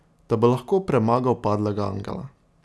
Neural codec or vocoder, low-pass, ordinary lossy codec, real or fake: none; none; none; real